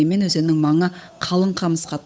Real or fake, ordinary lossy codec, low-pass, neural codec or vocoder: fake; none; none; codec, 16 kHz, 8 kbps, FunCodec, trained on Chinese and English, 25 frames a second